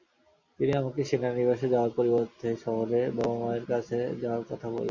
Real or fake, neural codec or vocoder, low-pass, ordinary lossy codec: real; none; 7.2 kHz; Opus, 64 kbps